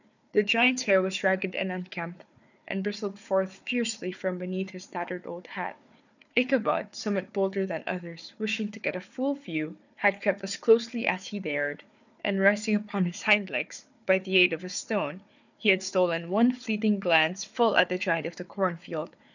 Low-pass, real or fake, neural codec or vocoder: 7.2 kHz; fake; codec, 16 kHz, 4 kbps, FunCodec, trained on Chinese and English, 50 frames a second